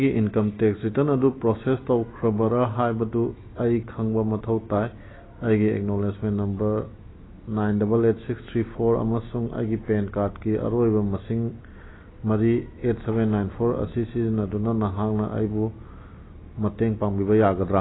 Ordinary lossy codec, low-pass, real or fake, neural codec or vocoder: AAC, 16 kbps; 7.2 kHz; real; none